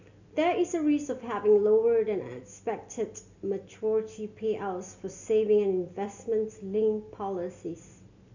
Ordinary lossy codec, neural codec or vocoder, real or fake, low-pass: none; none; real; 7.2 kHz